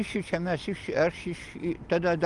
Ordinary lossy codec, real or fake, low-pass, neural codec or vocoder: Opus, 32 kbps; real; 10.8 kHz; none